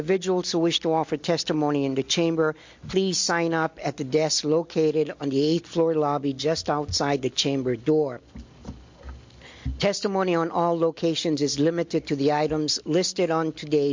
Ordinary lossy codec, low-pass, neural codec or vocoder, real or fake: MP3, 48 kbps; 7.2 kHz; none; real